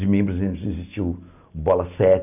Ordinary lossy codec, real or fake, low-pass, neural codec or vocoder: none; real; 3.6 kHz; none